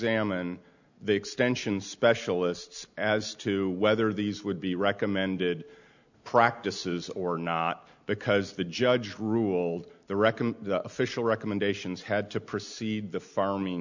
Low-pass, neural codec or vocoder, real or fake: 7.2 kHz; none; real